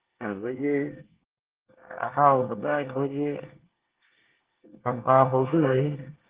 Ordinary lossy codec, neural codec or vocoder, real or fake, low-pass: Opus, 16 kbps; codec, 24 kHz, 1 kbps, SNAC; fake; 3.6 kHz